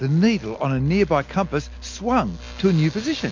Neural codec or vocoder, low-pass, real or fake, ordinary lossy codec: none; 7.2 kHz; real; MP3, 48 kbps